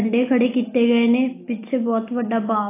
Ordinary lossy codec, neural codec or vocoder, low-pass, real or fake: AAC, 24 kbps; none; 3.6 kHz; real